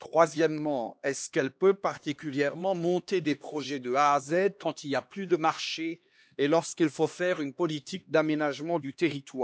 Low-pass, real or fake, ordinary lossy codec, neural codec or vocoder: none; fake; none; codec, 16 kHz, 2 kbps, X-Codec, HuBERT features, trained on LibriSpeech